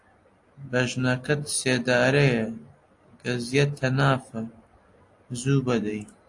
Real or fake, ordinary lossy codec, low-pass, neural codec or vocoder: real; AAC, 48 kbps; 10.8 kHz; none